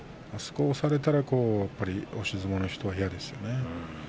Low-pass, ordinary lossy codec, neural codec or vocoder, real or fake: none; none; none; real